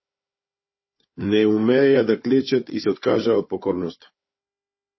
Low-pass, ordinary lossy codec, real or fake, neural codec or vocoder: 7.2 kHz; MP3, 24 kbps; fake; codec, 16 kHz, 4 kbps, FunCodec, trained on Chinese and English, 50 frames a second